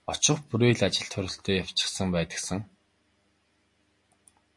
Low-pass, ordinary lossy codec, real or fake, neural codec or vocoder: 10.8 kHz; MP3, 48 kbps; real; none